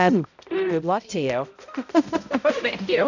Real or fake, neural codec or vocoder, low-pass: fake; codec, 16 kHz, 0.5 kbps, X-Codec, HuBERT features, trained on balanced general audio; 7.2 kHz